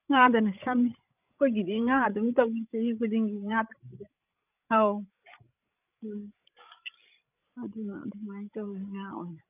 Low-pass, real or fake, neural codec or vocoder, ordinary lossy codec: 3.6 kHz; fake; codec, 16 kHz, 16 kbps, FreqCodec, larger model; none